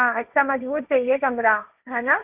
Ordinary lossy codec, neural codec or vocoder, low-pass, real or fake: Opus, 64 kbps; codec, 16 kHz, 1.1 kbps, Voila-Tokenizer; 3.6 kHz; fake